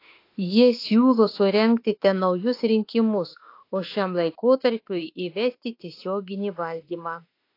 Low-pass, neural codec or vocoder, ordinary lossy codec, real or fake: 5.4 kHz; autoencoder, 48 kHz, 32 numbers a frame, DAC-VAE, trained on Japanese speech; AAC, 32 kbps; fake